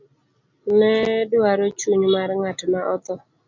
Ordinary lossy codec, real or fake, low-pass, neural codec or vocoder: MP3, 64 kbps; real; 7.2 kHz; none